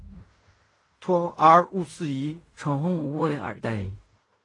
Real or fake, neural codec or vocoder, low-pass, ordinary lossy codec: fake; codec, 16 kHz in and 24 kHz out, 0.4 kbps, LongCat-Audio-Codec, fine tuned four codebook decoder; 10.8 kHz; AAC, 32 kbps